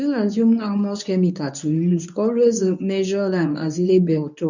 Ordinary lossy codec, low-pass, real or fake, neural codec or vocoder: none; 7.2 kHz; fake; codec, 24 kHz, 0.9 kbps, WavTokenizer, medium speech release version 1